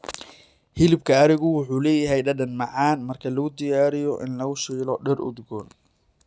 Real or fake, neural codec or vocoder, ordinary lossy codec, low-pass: real; none; none; none